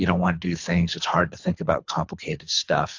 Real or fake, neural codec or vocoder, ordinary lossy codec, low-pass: fake; codec, 24 kHz, 3 kbps, HILCodec; AAC, 48 kbps; 7.2 kHz